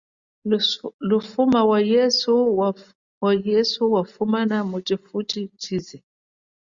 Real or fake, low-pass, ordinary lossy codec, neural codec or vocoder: real; 7.2 kHz; Opus, 64 kbps; none